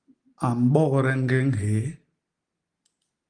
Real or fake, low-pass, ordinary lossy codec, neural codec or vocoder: real; 9.9 kHz; Opus, 24 kbps; none